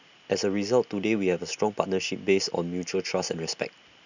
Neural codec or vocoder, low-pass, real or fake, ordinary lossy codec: none; 7.2 kHz; real; none